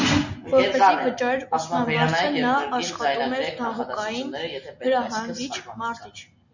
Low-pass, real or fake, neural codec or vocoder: 7.2 kHz; real; none